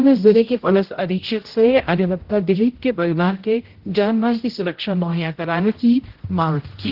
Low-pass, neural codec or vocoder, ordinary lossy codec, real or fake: 5.4 kHz; codec, 16 kHz, 0.5 kbps, X-Codec, HuBERT features, trained on general audio; Opus, 16 kbps; fake